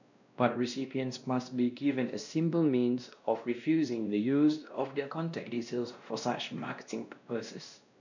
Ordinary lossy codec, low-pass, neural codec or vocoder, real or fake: none; 7.2 kHz; codec, 16 kHz, 1 kbps, X-Codec, WavLM features, trained on Multilingual LibriSpeech; fake